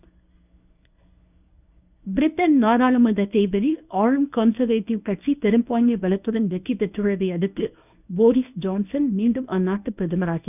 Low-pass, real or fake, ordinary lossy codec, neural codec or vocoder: 3.6 kHz; fake; none; codec, 24 kHz, 0.9 kbps, WavTokenizer, medium speech release version 1